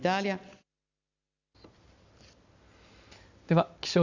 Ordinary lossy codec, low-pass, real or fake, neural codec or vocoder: Opus, 64 kbps; 7.2 kHz; real; none